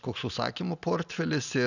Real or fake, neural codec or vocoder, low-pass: real; none; 7.2 kHz